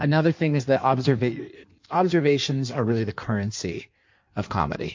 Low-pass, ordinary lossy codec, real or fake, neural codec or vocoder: 7.2 kHz; MP3, 48 kbps; fake; codec, 16 kHz in and 24 kHz out, 1.1 kbps, FireRedTTS-2 codec